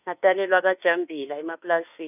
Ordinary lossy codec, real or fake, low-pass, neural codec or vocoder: none; fake; 3.6 kHz; autoencoder, 48 kHz, 32 numbers a frame, DAC-VAE, trained on Japanese speech